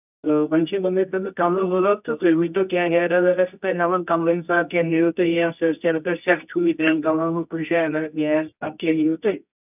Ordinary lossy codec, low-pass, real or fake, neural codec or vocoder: none; 3.6 kHz; fake; codec, 24 kHz, 0.9 kbps, WavTokenizer, medium music audio release